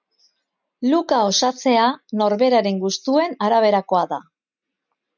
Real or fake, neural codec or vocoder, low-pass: real; none; 7.2 kHz